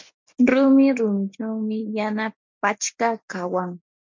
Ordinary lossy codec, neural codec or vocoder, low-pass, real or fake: MP3, 64 kbps; none; 7.2 kHz; real